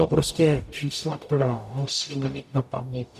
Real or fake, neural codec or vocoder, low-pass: fake; codec, 44.1 kHz, 0.9 kbps, DAC; 14.4 kHz